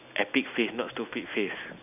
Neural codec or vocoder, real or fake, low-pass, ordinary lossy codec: none; real; 3.6 kHz; none